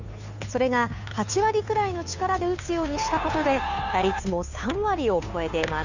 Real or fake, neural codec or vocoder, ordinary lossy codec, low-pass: fake; codec, 16 kHz, 2 kbps, FunCodec, trained on Chinese and English, 25 frames a second; none; 7.2 kHz